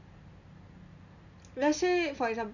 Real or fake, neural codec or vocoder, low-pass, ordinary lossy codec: real; none; 7.2 kHz; none